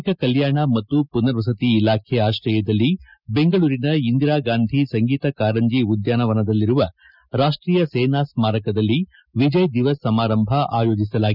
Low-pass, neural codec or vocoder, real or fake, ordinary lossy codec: 5.4 kHz; none; real; none